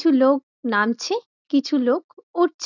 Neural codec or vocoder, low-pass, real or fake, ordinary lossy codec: none; 7.2 kHz; real; none